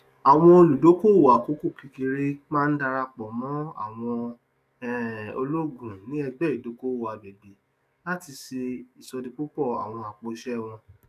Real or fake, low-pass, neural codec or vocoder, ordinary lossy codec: fake; 14.4 kHz; codec, 44.1 kHz, 7.8 kbps, DAC; none